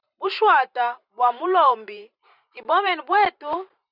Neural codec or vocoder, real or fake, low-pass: vocoder, 44.1 kHz, 128 mel bands every 512 samples, BigVGAN v2; fake; 5.4 kHz